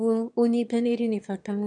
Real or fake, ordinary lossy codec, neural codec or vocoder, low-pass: fake; none; autoencoder, 22.05 kHz, a latent of 192 numbers a frame, VITS, trained on one speaker; 9.9 kHz